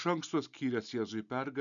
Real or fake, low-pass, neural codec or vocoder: real; 7.2 kHz; none